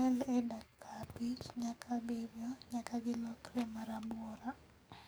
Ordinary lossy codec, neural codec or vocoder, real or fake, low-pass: none; codec, 44.1 kHz, 7.8 kbps, DAC; fake; none